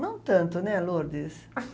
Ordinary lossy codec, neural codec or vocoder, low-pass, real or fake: none; none; none; real